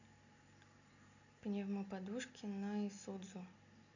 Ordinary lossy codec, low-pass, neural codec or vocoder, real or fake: none; 7.2 kHz; none; real